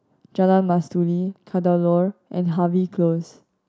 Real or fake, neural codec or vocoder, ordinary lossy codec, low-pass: real; none; none; none